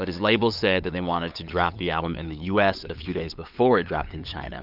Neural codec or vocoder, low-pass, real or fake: codec, 16 kHz, 8 kbps, FunCodec, trained on LibriTTS, 25 frames a second; 5.4 kHz; fake